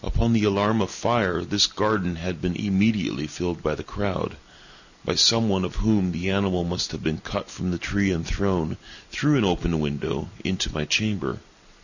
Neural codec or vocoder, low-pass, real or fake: none; 7.2 kHz; real